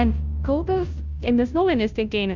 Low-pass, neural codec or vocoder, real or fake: 7.2 kHz; codec, 16 kHz, 0.5 kbps, FunCodec, trained on Chinese and English, 25 frames a second; fake